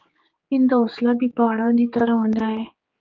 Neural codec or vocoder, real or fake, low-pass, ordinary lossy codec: codec, 16 kHz, 4 kbps, X-Codec, HuBERT features, trained on general audio; fake; 7.2 kHz; Opus, 24 kbps